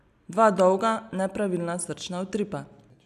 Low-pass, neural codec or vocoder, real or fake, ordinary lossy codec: 14.4 kHz; none; real; none